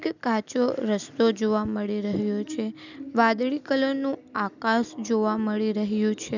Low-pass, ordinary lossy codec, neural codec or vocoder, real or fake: 7.2 kHz; none; none; real